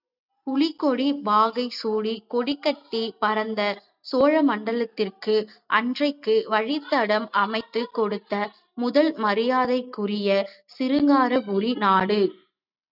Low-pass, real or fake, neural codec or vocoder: 5.4 kHz; real; none